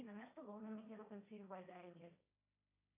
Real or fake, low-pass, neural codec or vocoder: fake; 3.6 kHz; codec, 16 kHz, 1.1 kbps, Voila-Tokenizer